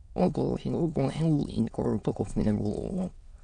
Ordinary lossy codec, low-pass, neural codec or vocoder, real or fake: none; 9.9 kHz; autoencoder, 22.05 kHz, a latent of 192 numbers a frame, VITS, trained on many speakers; fake